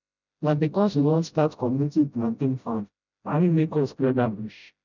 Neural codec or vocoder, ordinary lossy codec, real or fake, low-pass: codec, 16 kHz, 0.5 kbps, FreqCodec, smaller model; none; fake; 7.2 kHz